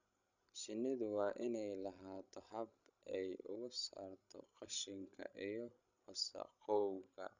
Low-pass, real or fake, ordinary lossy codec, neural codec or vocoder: 7.2 kHz; fake; none; codec, 16 kHz, 16 kbps, FreqCodec, larger model